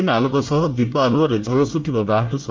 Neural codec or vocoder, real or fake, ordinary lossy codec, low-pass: codec, 24 kHz, 1 kbps, SNAC; fake; Opus, 32 kbps; 7.2 kHz